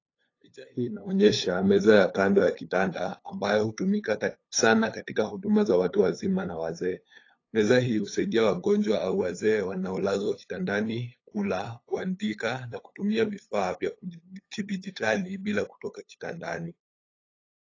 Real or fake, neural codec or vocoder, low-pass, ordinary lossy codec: fake; codec, 16 kHz, 8 kbps, FunCodec, trained on LibriTTS, 25 frames a second; 7.2 kHz; AAC, 32 kbps